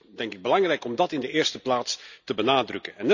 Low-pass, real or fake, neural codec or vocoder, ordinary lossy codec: 7.2 kHz; real; none; none